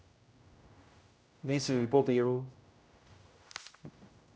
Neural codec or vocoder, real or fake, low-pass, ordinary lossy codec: codec, 16 kHz, 0.5 kbps, X-Codec, HuBERT features, trained on general audio; fake; none; none